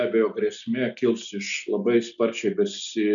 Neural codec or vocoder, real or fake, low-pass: none; real; 7.2 kHz